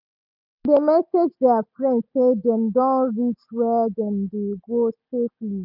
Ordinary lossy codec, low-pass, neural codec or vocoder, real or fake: none; 5.4 kHz; none; real